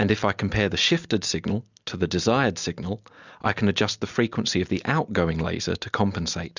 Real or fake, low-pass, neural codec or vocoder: real; 7.2 kHz; none